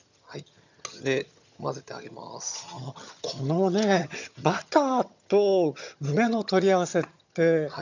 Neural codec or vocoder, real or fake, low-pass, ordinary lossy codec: vocoder, 22.05 kHz, 80 mel bands, HiFi-GAN; fake; 7.2 kHz; none